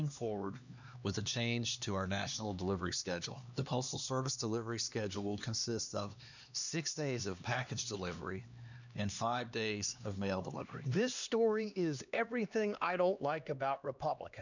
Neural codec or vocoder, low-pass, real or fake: codec, 16 kHz, 2 kbps, X-Codec, HuBERT features, trained on LibriSpeech; 7.2 kHz; fake